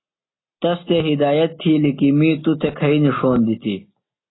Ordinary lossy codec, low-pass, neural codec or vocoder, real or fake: AAC, 16 kbps; 7.2 kHz; none; real